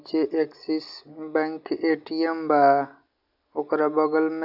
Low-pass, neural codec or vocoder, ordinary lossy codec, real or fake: 5.4 kHz; none; none; real